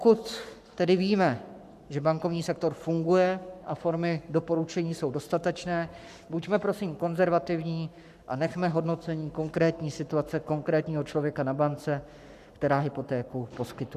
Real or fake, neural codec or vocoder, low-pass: fake; codec, 44.1 kHz, 7.8 kbps, Pupu-Codec; 14.4 kHz